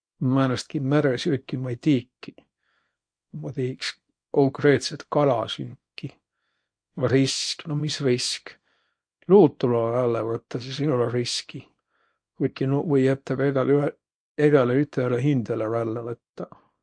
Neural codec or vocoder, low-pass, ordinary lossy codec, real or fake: codec, 24 kHz, 0.9 kbps, WavTokenizer, small release; 9.9 kHz; MP3, 48 kbps; fake